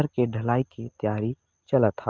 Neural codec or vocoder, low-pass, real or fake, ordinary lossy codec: none; 7.2 kHz; real; Opus, 32 kbps